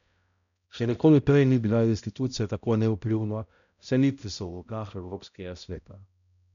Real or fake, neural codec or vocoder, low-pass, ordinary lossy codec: fake; codec, 16 kHz, 0.5 kbps, X-Codec, HuBERT features, trained on balanced general audio; 7.2 kHz; MP3, 96 kbps